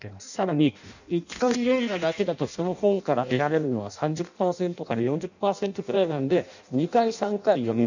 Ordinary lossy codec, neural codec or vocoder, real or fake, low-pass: none; codec, 16 kHz in and 24 kHz out, 0.6 kbps, FireRedTTS-2 codec; fake; 7.2 kHz